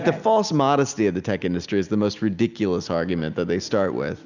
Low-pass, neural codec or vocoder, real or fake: 7.2 kHz; none; real